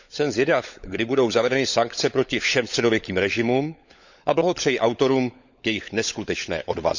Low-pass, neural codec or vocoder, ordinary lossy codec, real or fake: 7.2 kHz; codec, 16 kHz, 16 kbps, FunCodec, trained on LibriTTS, 50 frames a second; Opus, 64 kbps; fake